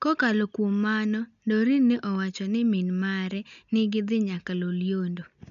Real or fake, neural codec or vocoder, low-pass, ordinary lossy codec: real; none; 7.2 kHz; none